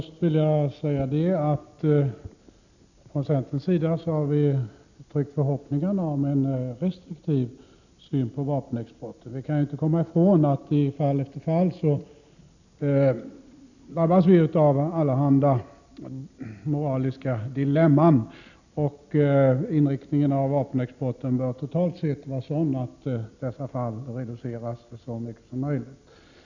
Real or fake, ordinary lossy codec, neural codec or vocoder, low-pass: real; none; none; 7.2 kHz